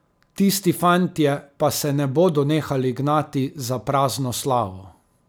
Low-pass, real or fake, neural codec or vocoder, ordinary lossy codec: none; real; none; none